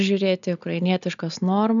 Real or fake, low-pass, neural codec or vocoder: real; 7.2 kHz; none